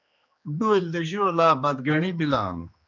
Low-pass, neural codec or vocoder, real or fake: 7.2 kHz; codec, 16 kHz, 2 kbps, X-Codec, HuBERT features, trained on general audio; fake